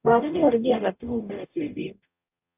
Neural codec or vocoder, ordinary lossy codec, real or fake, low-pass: codec, 44.1 kHz, 0.9 kbps, DAC; AAC, 32 kbps; fake; 3.6 kHz